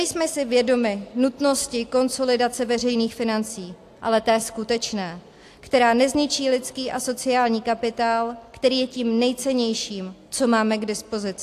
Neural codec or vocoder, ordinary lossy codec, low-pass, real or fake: none; AAC, 64 kbps; 14.4 kHz; real